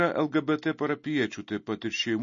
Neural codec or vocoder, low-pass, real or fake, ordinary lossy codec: none; 7.2 kHz; real; MP3, 32 kbps